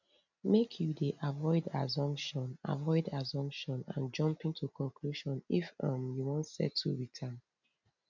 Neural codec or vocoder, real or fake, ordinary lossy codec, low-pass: none; real; none; 7.2 kHz